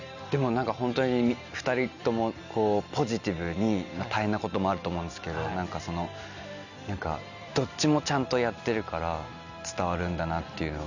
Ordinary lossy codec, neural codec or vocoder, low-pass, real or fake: none; none; 7.2 kHz; real